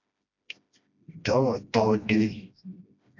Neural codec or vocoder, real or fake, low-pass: codec, 16 kHz, 1 kbps, FreqCodec, smaller model; fake; 7.2 kHz